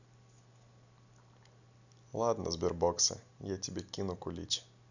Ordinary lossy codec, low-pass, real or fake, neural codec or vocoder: none; 7.2 kHz; real; none